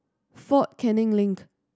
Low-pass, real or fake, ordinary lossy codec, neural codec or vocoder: none; real; none; none